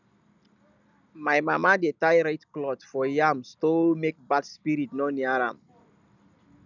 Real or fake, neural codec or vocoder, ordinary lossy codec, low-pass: real; none; none; 7.2 kHz